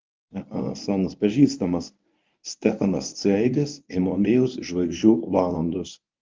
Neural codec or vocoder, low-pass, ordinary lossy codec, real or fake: codec, 24 kHz, 0.9 kbps, WavTokenizer, medium speech release version 1; 7.2 kHz; Opus, 24 kbps; fake